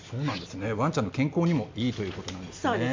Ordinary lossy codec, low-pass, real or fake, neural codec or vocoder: MP3, 64 kbps; 7.2 kHz; real; none